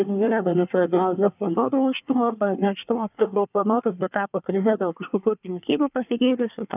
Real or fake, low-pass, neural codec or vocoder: fake; 3.6 kHz; codec, 24 kHz, 1 kbps, SNAC